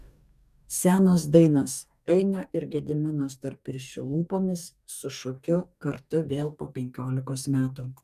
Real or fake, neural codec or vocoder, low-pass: fake; codec, 44.1 kHz, 2.6 kbps, DAC; 14.4 kHz